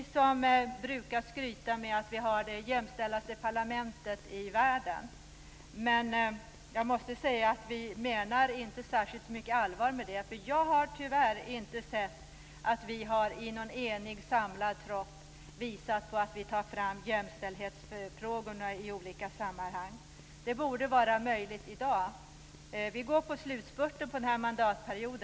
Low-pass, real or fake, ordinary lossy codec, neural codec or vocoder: none; real; none; none